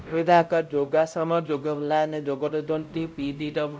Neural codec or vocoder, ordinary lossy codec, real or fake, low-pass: codec, 16 kHz, 0.5 kbps, X-Codec, WavLM features, trained on Multilingual LibriSpeech; none; fake; none